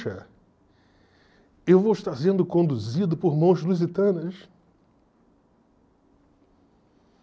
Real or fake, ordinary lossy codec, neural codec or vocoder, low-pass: real; none; none; none